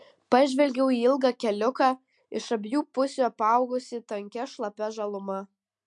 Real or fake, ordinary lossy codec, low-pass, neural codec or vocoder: real; MP3, 64 kbps; 10.8 kHz; none